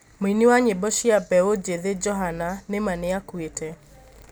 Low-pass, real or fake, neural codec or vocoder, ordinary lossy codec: none; real; none; none